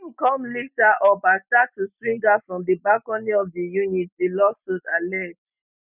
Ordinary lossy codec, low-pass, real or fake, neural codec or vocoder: none; 3.6 kHz; real; none